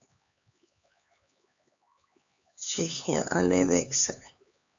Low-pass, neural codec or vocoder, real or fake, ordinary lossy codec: 7.2 kHz; codec, 16 kHz, 4 kbps, X-Codec, HuBERT features, trained on LibriSpeech; fake; AAC, 64 kbps